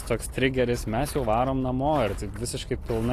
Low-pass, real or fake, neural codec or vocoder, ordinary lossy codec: 14.4 kHz; real; none; AAC, 48 kbps